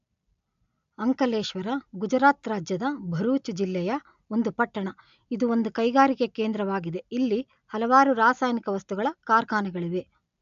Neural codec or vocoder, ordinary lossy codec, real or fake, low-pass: none; AAC, 96 kbps; real; 7.2 kHz